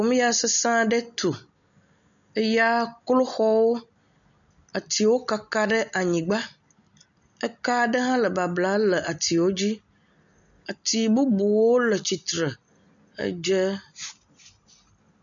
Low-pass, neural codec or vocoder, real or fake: 7.2 kHz; none; real